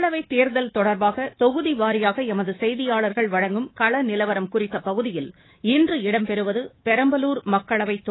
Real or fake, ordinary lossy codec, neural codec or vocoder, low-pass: fake; AAC, 16 kbps; codec, 24 kHz, 3.1 kbps, DualCodec; 7.2 kHz